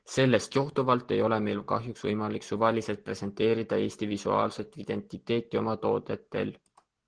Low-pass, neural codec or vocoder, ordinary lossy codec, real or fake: 9.9 kHz; none; Opus, 16 kbps; real